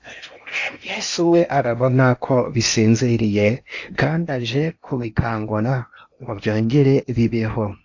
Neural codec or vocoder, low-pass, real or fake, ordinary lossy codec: codec, 16 kHz in and 24 kHz out, 0.8 kbps, FocalCodec, streaming, 65536 codes; 7.2 kHz; fake; AAC, 48 kbps